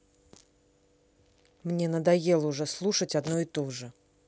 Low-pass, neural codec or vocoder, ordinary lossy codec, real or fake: none; none; none; real